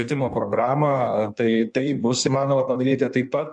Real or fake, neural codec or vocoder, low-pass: fake; codec, 16 kHz in and 24 kHz out, 1.1 kbps, FireRedTTS-2 codec; 9.9 kHz